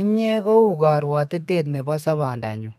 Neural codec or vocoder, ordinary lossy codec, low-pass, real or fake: codec, 32 kHz, 1.9 kbps, SNAC; none; 14.4 kHz; fake